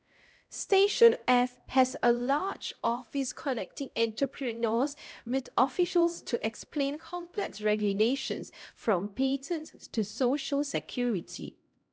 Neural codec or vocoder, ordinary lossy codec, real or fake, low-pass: codec, 16 kHz, 0.5 kbps, X-Codec, HuBERT features, trained on LibriSpeech; none; fake; none